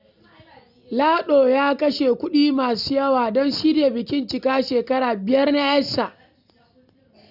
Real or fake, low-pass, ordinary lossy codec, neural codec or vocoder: real; 5.4 kHz; none; none